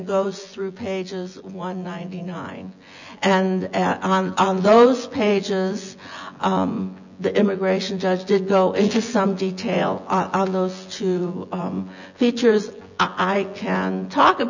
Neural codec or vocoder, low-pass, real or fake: vocoder, 24 kHz, 100 mel bands, Vocos; 7.2 kHz; fake